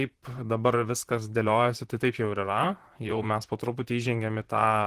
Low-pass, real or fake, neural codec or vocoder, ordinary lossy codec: 14.4 kHz; fake; vocoder, 44.1 kHz, 128 mel bands, Pupu-Vocoder; Opus, 16 kbps